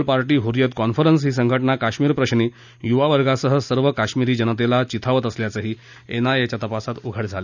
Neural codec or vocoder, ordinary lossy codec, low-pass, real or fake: none; none; 7.2 kHz; real